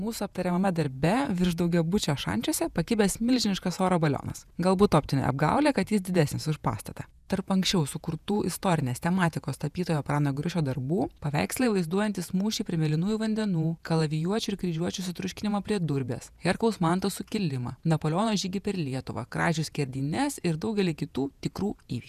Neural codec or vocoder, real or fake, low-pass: vocoder, 48 kHz, 128 mel bands, Vocos; fake; 14.4 kHz